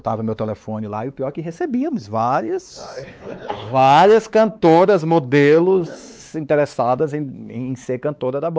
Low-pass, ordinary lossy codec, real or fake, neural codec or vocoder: none; none; fake; codec, 16 kHz, 4 kbps, X-Codec, WavLM features, trained on Multilingual LibriSpeech